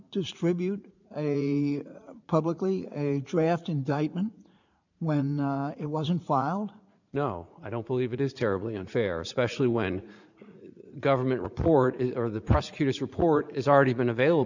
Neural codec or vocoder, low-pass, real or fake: vocoder, 44.1 kHz, 80 mel bands, Vocos; 7.2 kHz; fake